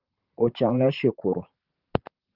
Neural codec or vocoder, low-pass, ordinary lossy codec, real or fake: vocoder, 44.1 kHz, 128 mel bands, Pupu-Vocoder; 5.4 kHz; Opus, 32 kbps; fake